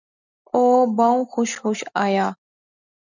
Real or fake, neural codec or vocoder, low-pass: real; none; 7.2 kHz